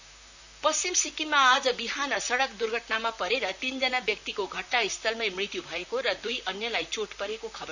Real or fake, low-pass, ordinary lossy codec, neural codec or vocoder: fake; 7.2 kHz; none; vocoder, 44.1 kHz, 128 mel bands, Pupu-Vocoder